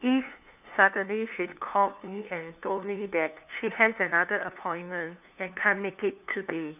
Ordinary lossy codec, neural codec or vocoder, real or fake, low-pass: none; codec, 16 kHz, 2 kbps, FunCodec, trained on LibriTTS, 25 frames a second; fake; 3.6 kHz